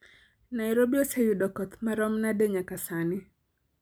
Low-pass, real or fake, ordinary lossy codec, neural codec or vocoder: none; real; none; none